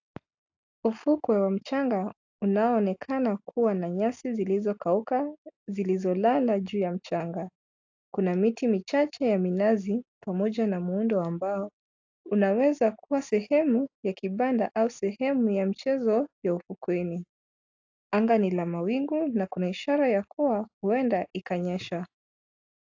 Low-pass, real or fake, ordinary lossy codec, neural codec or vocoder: 7.2 kHz; real; AAC, 48 kbps; none